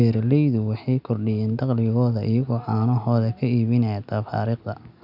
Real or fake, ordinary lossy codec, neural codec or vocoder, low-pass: real; none; none; 5.4 kHz